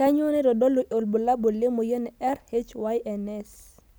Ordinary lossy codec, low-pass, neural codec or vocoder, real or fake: none; none; none; real